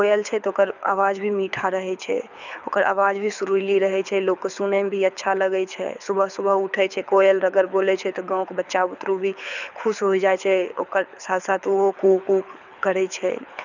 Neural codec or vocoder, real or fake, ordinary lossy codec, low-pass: codec, 24 kHz, 6 kbps, HILCodec; fake; none; 7.2 kHz